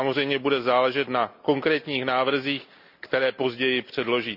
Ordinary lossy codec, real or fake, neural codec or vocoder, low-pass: none; real; none; 5.4 kHz